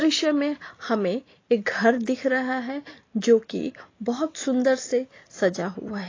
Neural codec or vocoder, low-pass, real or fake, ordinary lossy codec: none; 7.2 kHz; real; AAC, 32 kbps